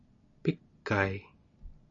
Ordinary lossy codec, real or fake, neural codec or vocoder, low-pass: AAC, 64 kbps; real; none; 7.2 kHz